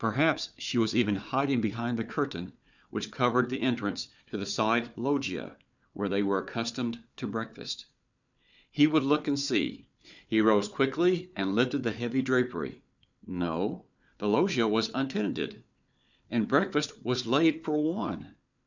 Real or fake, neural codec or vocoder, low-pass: fake; codec, 16 kHz, 4 kbps, FunCodec, trained on Chinese and English, 50 frames a second; 7.2 kHz